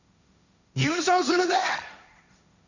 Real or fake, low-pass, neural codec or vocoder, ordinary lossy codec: fake; 7.2 kHz; codec, 16 kHz, 1.1 kbps, Voila-Tokenizer; none